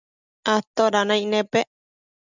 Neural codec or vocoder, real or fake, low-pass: none; real; 7.2 kHz